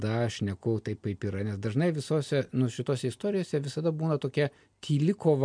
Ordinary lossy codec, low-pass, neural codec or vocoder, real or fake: MP3, 64 kbps; 9.9 kHz; none; real